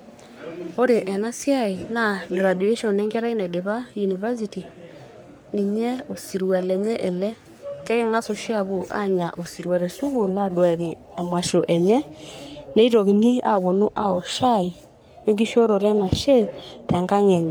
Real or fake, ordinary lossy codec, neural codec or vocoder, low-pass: fake; none; codec, 44.1 kHz, 3.4 kbps, Pupu-Codec; none